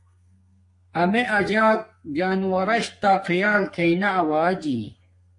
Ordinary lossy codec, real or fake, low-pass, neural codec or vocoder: MP3, 48 kbps; fake; 10.8 kHz; codec, 32 kHz, 1.9 kbps, SNAC